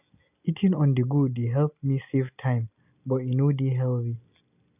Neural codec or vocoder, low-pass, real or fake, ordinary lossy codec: none; 3.6 kHz; real; none